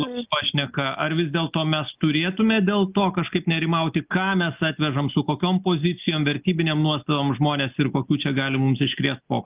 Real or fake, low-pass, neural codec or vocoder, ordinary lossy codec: real; 3.6 kHz; none; Opus, 24 kbps